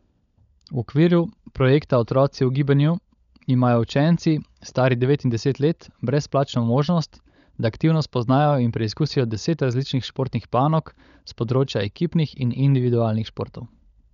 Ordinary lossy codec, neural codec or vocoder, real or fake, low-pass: none; codec, 16 kHz, 16 kbps, FunCodec, trained on LibriTTS, 50 frames a second; fake; 7.2 kHz